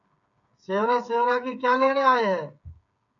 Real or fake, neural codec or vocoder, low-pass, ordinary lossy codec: fake; codec, 16 kHz, 8 kbps, FreqCodec, smaller model; 7.2 kHz; MP3, 64 kbps